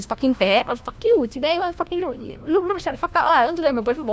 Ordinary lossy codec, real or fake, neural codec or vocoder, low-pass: none; fake; codec, 16 kHz, 1 kbps, FunCodec, trained on LibriTTS, 50 frames a second; none